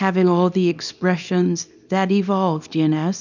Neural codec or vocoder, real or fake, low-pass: codec, 24 kHz, 0.9 kbps, WavTokenizer, small release; fake; 7.2 kHz